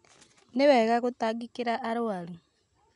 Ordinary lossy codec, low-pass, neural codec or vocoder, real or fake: none; 10.8 kHz; none; real